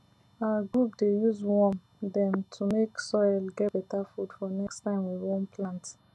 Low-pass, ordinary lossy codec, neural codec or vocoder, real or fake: none; none; none; real